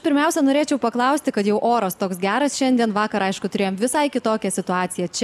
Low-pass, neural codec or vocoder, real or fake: 14.4 kHz; none; real